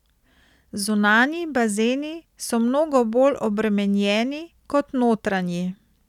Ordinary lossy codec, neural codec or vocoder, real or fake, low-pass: none; none; real; 19.8 kHz